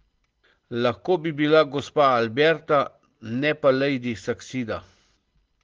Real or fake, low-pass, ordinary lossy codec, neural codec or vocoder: real; 7.2 kHz; Opus, 16 kbps; none